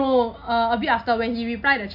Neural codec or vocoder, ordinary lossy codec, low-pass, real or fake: none; none; 5.4 kHz; real